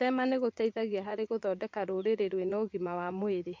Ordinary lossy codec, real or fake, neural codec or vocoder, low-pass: MP3, 48 kbps; fake; vocoder, 44.1 kHz, 80 mel bands, Vocos; 7.2 kHz